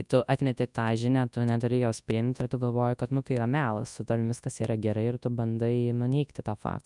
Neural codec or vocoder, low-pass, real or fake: codec, 24 kHz, 0.9 kbps, WavTokenizer, large speech release; 10.8 kHz; fake